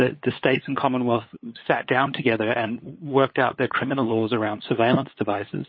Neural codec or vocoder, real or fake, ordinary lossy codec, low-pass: codec, 16 kHz, 8 kbps, FunCodec, trained on LibriTTS, 25 frames a second; fake; MP3, 24 kbps; 7.2 kHz